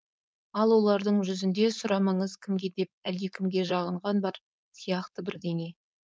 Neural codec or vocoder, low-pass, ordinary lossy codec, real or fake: codec, 16 kHz, 4.8 kbps, FACodec; none; none; fake